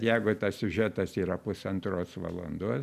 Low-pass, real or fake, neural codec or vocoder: 14.4 kHz; real; none